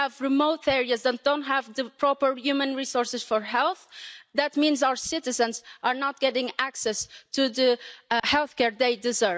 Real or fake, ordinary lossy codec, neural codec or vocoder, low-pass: real; none; none; none